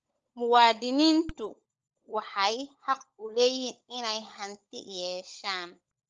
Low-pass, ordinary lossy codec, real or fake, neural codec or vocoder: 7.2 kHz; Opus, 24 kbps; fake; codec, 16 kHz, 16 kbps, FunCodec, trained on LibriTTS, 50 frames a second